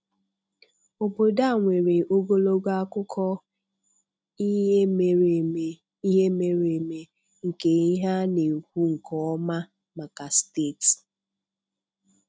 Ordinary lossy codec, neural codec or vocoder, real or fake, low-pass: none; none; real; none